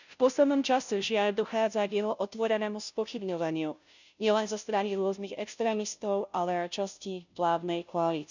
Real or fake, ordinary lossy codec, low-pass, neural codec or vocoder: fake; none; 7.2 kHz; codec, 16 kHz, 0.5 kbps, FunCodec, trained on Chinese and English, 25 frames a second